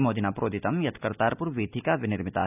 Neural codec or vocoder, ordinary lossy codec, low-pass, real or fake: none; none; 3.6 kHz; real